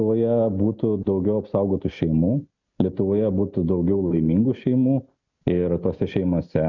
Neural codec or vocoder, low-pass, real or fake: none; 7.2 kHz; real